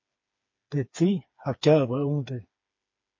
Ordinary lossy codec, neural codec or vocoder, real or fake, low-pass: MP3, 32 kbps; codec, 16 kHz, 4 kbps, FreqCodec, smaller model; fake; 7.2 kHz